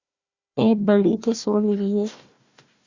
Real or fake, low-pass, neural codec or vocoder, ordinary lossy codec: fake; 7.2 kHz; codec, 16 kHz, 1 kbps, FunCodec, trained on Chinese and English, 50 frames a second; Opus, 64 kbps